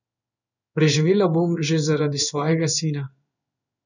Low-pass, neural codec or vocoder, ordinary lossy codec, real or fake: 7.2 kHz; codec, 16 kHz in and 24 kHz out, 1 kbps, XY-Tokenizer; none; fake